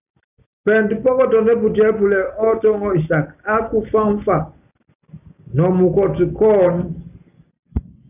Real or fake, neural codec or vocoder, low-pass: real; none; 3.6 kHz